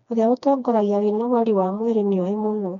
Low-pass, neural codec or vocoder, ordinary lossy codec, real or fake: 7.2 kHz; codec, 16 kHz, 2 kbps, FreqCodec, smaller model; none; fake